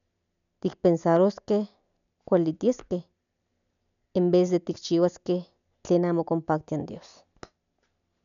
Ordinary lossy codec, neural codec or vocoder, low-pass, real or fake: none; none; 7.2 kHz; real